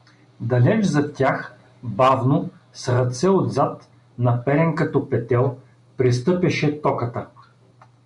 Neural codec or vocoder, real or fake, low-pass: none; real; 10.8 kHz